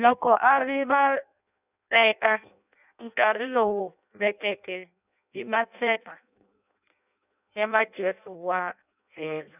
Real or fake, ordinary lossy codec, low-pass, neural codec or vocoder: fake; none; 3.6 kHz; codec, 16 kHz in and 24 kHz out, 0.6 kbps, FireRedTTS-2 codec